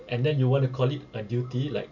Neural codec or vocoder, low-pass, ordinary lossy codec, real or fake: none; 7.2 kHz; none; real